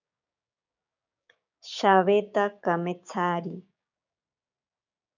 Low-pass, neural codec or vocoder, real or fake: 7.2 kHz; codec, 16 kHz, 6 kbps, DAC; fake